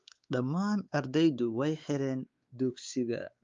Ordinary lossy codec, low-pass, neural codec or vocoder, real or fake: Opus, 32 kbps; 7.2 kHz; codec, 16 kHz, 4 kbps, X-Codec, WavLM features, trained on Multilingual LibriSpeech; fake